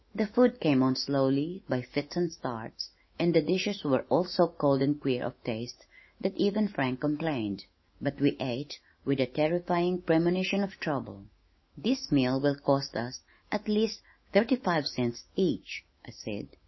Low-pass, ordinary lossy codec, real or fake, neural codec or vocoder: 7.2 kHz; MP3, 24 kbps; fake; autoencoder, 48 kHz, 128 numbers a frame, DAC-VAE, trained on Japanese speech